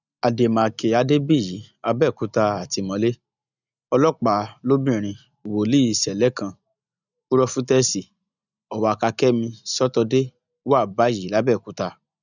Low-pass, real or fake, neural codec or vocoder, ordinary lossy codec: 7.2 kHz; real; none; none